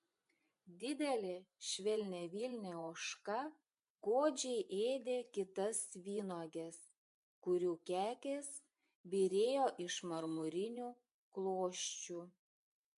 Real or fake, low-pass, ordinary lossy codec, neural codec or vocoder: fake; 10.8 kHz; MP3, 64 kbps; vocoder, 24 kHz, 100 mel bands, Vocos